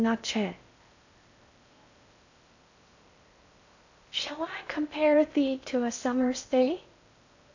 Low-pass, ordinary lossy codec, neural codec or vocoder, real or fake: 7.2 kHz; AAC, 48 kbps; codec, 16 kHz in and 24 kHz out, 0.6 kbps, FocalCodec, streaming, 4096 codes; fake